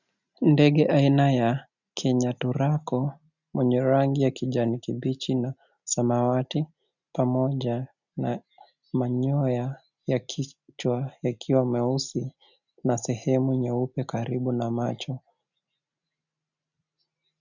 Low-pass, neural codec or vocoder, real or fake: 7.2 kHz; none; real